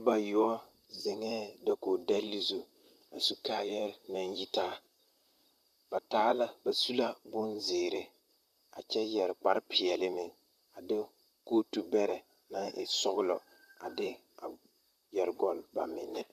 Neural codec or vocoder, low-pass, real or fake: vocoder, 44.1 kHz, 128 mel bands, Pupu-Vocoder; 14.4 kHz; fake